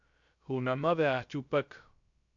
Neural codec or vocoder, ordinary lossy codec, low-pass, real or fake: codec, 16 kHz, 0.3 kbps, FocalCodec; AAC, 64 kbps; 7.2 kHz; fake